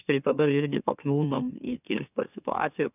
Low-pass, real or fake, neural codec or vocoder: 3.6 kHz; fake; autoencoder, 44.1 kHz, a latent of 192 numbers a frame, MeloTTS